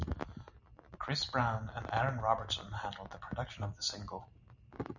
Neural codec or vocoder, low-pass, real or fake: none; 7.2 kHz; real